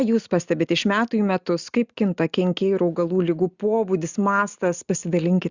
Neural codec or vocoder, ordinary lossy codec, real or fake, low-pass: none; Opus, 64 kbps; real; 7.2 kHz